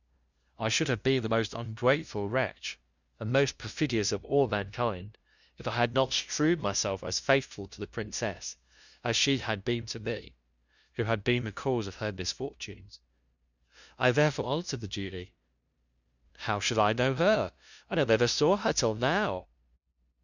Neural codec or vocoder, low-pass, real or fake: codec, 16 kHz, 0.5 kbps, FunCodec, trained on LibriTTS, 25 frames a second; 7.2 kHz; fake